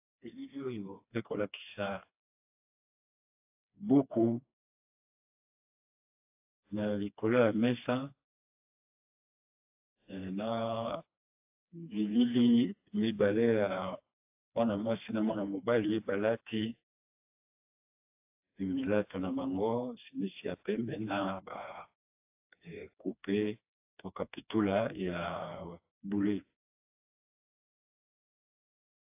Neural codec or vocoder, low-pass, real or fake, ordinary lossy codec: codec, 16 kHz, 2 kbps, FreqCodec, smaller model; 3.6 kHz; fake; AAC, 32 kbps